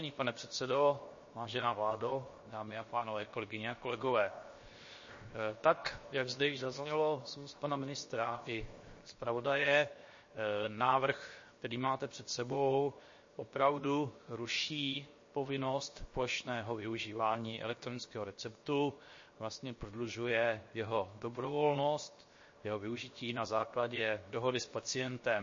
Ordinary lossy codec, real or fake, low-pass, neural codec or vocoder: MP3, 32 kbps; fake; 7.2 kHz; codec, 16 kHz, 0.7 kbps, FocalCodec